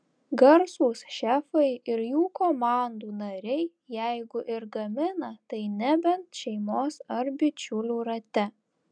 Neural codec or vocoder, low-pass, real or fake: none; 9.9 kHz; real